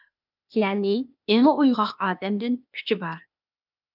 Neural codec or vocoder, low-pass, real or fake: codec, 16 kHz, 0.8 kbps, ZipCodec; 5.4 kHz; fake